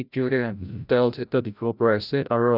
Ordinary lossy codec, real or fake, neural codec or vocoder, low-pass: none; fake; codec, 16 kHz, 0.5 kbps, FreqCodec, larger model; 5.4 kHz